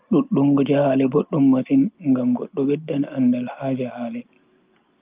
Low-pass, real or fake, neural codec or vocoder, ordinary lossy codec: 3.6 kHz; real; none; Opus, 24 kbps